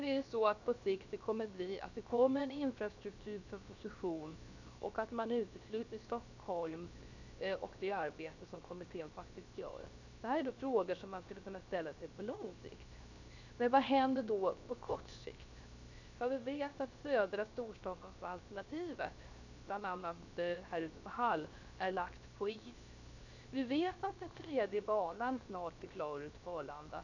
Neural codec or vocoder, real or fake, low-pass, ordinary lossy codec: codec, 16 kHz, 0.7 kbps, FocalCodec; fake; 7.2 kHz; none